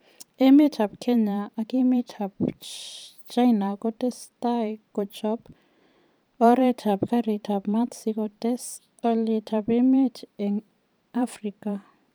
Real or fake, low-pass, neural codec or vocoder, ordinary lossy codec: fake; none; vocoder, 44.1 kHz, 128 mel bands every 512 samples, BigVGAN v2; none